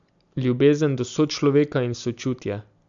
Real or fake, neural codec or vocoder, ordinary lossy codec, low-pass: real; none; none; 7.2 kHz